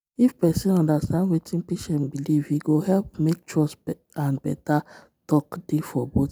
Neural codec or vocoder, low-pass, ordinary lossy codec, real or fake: none; none; none; real